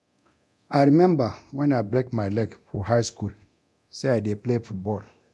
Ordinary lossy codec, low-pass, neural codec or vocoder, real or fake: none; none; codec, 24 kHz, 0.9 kbps, DualCodec; fake